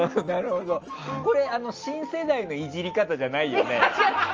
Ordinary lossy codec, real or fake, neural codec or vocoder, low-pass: Opus, 24 kbps; real; none; 7.2 kHz